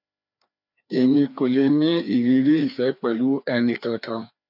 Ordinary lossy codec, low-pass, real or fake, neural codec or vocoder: none; 5.4 kHz; fake; codec, 16 kHz, 2 kbps, FreqCodec, larger model